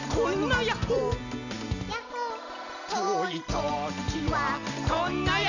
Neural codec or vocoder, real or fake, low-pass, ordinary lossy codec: none; real; 7.2 kHz; none